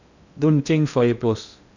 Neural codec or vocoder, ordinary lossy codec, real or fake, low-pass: codec, 16 kHz in and 24 kHz out, 0.6 kbps, FocalCodec, streaming, 2048 codes; none; fake; 7.2 kHz